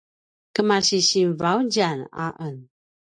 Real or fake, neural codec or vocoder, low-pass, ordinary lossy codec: real; none; 9.9 kHz; AAC, 64 kbps